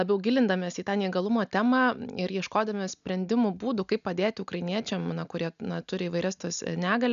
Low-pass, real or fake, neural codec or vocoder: 7.2 kHz; real; none